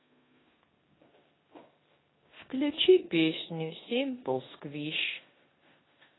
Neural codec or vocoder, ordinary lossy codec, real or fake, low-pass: codec, 16 kHz in and 24 kHz out, 0.9 kbps, LongCat-Audio-Codec, four codebook decoder; AAC, 16 kbps; fake; 7.2 kHz